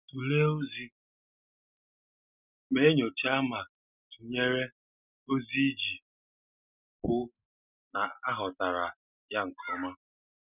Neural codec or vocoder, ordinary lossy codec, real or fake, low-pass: none; none; real; 3.6 kHz